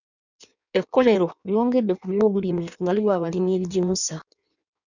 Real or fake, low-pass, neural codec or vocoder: fake; 7.2 kHz; codec, 16 kHz in and 24 kHz out, 1.1 kbps, FireRedTTS-2 codec